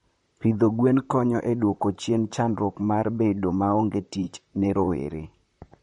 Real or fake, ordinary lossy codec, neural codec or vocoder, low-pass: fake; MP3, 48 kbps; vocoder, 44.1 kHz, 128 mel bands, Pupu-Vocoder; 19.8 kHz